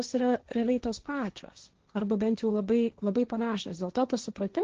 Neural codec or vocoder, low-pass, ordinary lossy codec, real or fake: codec, 16 kHz, 1.1 kbps, Voila-Tokenizer; 7.2 kHz; Opus, 16 kbps; fake